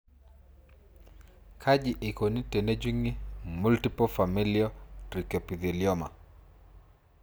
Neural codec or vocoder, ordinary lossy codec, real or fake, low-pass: none; none; real; none